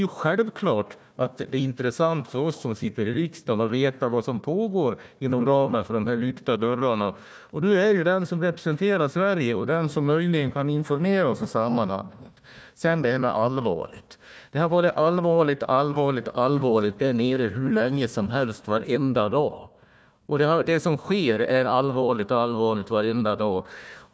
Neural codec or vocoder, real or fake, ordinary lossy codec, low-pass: codec, 16 kHz, 1 kbps, FunCodec, trained on Chinese and English, 50 frames a second; fake; none; none